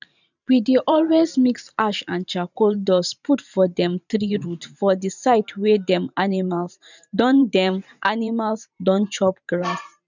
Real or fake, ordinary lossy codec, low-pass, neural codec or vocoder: fake; none; 7.2 kHz; vocoder, 44.1 kHz, 128 mel bands every 512 samples, BigVGAN v2